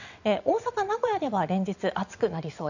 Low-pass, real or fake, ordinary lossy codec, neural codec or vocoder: 7.2 kHz; fake; none; vocoder, 22.05 kHz, 80 mel bands, WaveNeXt